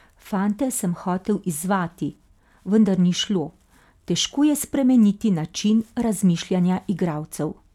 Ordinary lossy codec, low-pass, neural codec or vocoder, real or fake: none; 19.8 kHz; none; real